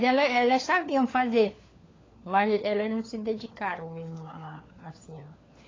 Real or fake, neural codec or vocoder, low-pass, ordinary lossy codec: fake; codec, 16 kHz, 4 kbps, FunCodec, trained on LibriTTS, 50 frames a second; 7.2 kHz; AAC, 32 kbps